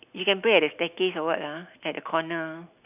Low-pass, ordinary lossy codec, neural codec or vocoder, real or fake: 3.6 kHz; none; none; real